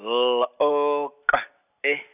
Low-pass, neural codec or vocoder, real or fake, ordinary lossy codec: 3.6 kHz; none; real; none